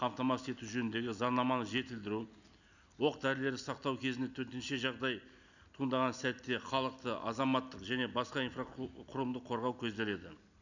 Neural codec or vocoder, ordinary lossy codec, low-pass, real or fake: none; none; 7.2 kHz; real